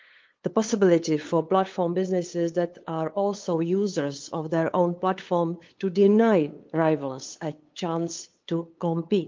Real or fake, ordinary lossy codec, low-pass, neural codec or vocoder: fake; Opus, 24 kbps; 7.2 kHz; codec, 16 kHz, 8 kbps, FunCodec, trained on LibriTTS, 25 frames a second